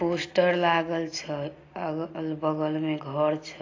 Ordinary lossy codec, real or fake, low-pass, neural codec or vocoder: AAC, 32 kbps; real; 7.2 kHz; none